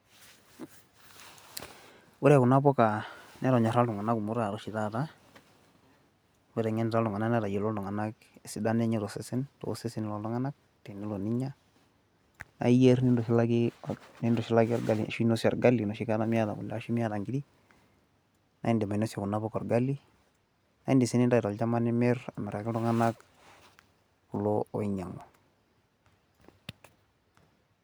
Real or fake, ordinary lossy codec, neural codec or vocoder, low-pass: real; none; none; none